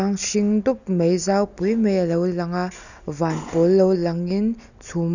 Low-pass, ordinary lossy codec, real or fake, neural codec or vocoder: 7.2 kHz; none; real; none